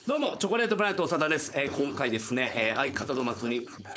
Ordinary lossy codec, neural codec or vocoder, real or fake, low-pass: none; codec, 16 kHz, 4.8 kbps, FACodec; fake; none